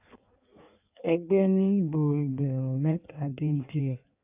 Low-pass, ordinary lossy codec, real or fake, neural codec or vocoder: 3.6 kHz; AAC, 32 kbps; fake; codec, 16 kHz in and 24 kHz out, 1.1 kbps, FireRedTTS-2 codec